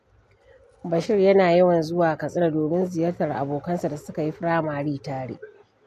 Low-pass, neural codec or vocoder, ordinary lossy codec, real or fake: 14.4 kHz; none; MP3, 64 kbps; real